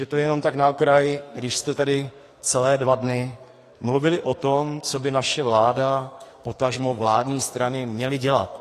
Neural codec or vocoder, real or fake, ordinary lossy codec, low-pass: codec, 44.1 kHz, 2.6 kbps, SNAC; fake; AAC, 48 kbps; 14.4 kHz